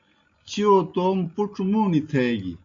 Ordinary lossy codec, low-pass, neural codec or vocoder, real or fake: MP3, 64 kbps; 7.2 kHz; none; real